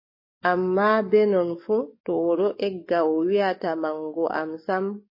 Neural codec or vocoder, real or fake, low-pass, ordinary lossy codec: codec, 44.1 kHz, 7.8 kbps, DAC; fake; 5.4 kHz; MP3, 24 kbps